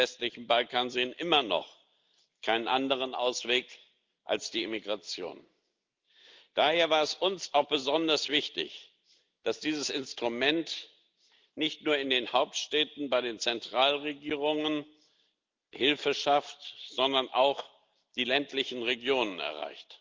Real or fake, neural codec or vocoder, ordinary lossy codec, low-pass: real; none; Opus, 32 kbps; 7.2 kHz